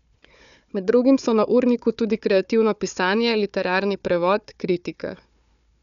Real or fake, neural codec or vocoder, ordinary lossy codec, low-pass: fake; codec, 16 kHz, 4 kbps, FunCodec, trained on Chinese and English, 50 frames a second; none; 7.2 kHz